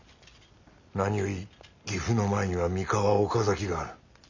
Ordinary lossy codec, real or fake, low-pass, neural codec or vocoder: none; real; 7.2 kHz; none